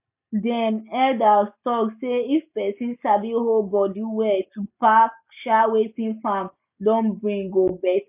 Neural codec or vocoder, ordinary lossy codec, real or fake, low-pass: none; none; real; 3.6 kHz